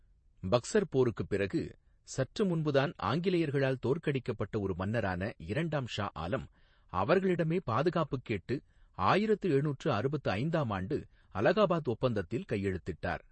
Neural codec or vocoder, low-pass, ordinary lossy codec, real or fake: vocoder, 48 kHz, 128 mel bands, Vocos; 9.9 kHz; MP3, 32 kbps; fake